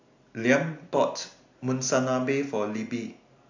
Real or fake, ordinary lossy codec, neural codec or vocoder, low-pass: real; AAC, 48 kbps; none; 7.2 kHz